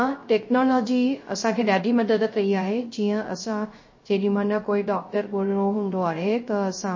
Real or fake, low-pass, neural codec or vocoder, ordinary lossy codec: fake; 7.2 kHz; codec, 16 kHz, 0.3 kbps, FocalCodec; MP3, 32 kbps